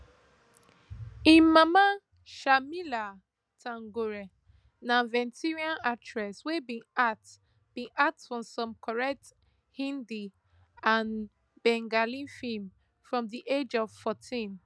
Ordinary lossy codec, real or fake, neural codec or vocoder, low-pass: none; real; none; none